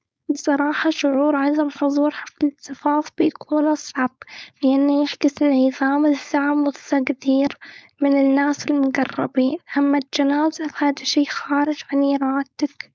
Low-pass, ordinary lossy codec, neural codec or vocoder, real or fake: none; none; codec, 16 kHz, 4.8 kbps, FACodec; fake